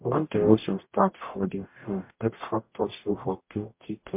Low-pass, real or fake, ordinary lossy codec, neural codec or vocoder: 3.6 kHz; fake; MP3, 24 kbps; codec, 44.1 kHz, 0.9 kbps, DAC